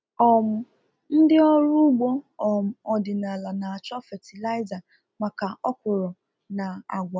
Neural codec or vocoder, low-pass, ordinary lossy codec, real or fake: none; none; none; real